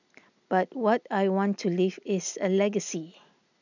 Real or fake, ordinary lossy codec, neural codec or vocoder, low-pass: real; none; none; 7.2 kHz